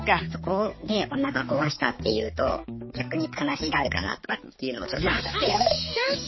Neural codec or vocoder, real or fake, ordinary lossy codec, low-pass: codec, 16 kHz, 4 kbps, X-Codec, HuBERT features, trained on balanced general audio; fake; MP3, 24 kbps; 7.2 kHz